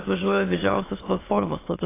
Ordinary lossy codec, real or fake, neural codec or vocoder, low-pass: AAC, 16 kbps; fake; autoencoder, 22.05 kHz, a latent of 192 numbers a frame, VITS, trained on many speakers; 3.6 kHz